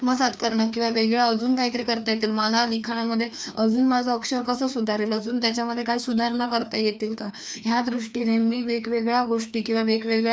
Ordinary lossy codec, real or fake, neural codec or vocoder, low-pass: none; fake; codec, 16 kHz, 2 kbps, FreqCodec, larger model; none